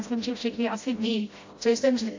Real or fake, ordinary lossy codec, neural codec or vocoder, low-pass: fake; AAC, 48 kbps; codec, 16 kHz, 0.5 kbps, FreqCodec, smaller model; 7.2 kHz